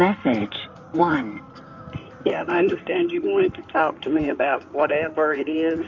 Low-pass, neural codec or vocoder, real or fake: 7.2 kHz; codec, 16 kHz in and 24 kHz out, 2.2 kbps, FireRedTTS-2 codec; fake